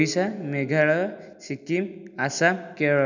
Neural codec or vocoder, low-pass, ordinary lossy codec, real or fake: none; 7.2 kHz; none; real